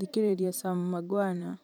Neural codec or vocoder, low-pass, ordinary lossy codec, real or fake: vocoder, 44.1 kHz, 128 mel bands every 512 samples, BigVGAN v2; none; none; fake